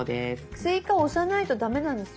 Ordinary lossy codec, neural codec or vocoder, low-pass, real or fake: none; none; none; real